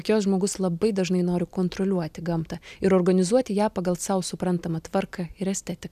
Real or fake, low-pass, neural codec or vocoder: real; 14.4 kHz; none